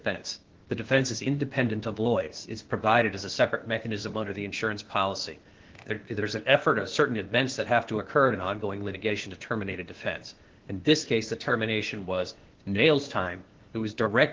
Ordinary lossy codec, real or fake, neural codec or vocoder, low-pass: Opus, 16 kbps; fake; codec, 16 kHz, 0.8 kbps, ZipCodec; 7.2 kHz